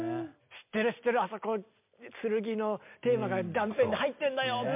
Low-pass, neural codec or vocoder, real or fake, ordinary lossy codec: 3.6 kHz; none; real; MP3, 32 kbps